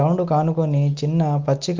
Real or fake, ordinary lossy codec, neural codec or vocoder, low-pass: real; Opus, 32 kbps; none; 7.2 kHz